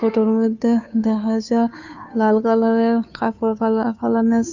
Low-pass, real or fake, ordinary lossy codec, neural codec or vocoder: 7.2 kHz; fake; none; codec, 16 kHz, 2 kbps, FunCodec, trained on Chinese and English, 25 frames a second